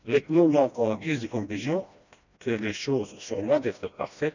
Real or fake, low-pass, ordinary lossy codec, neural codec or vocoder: fake; 7.2 kHz; none; codec, 16 kHz, 1 kbps, FreqCodec, smaller model